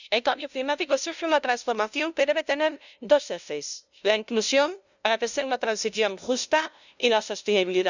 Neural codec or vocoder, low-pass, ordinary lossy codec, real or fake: codec, 16 kHz, 0.5 kbps, FunCodec, trained on LibriTTS, 25 frames a second; 7.2 kHz; none; fake